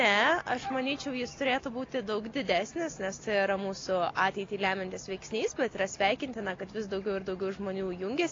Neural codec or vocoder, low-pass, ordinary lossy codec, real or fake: none; 7.2 kHz; AAC, 32 kbps; real